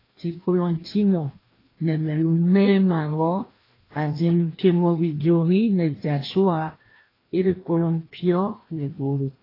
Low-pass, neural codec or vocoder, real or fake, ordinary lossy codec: 5.4 kHz; codec, 16 kHz, 1 kbps, FreqCodec, larger model; fake; AAC, 24 kbps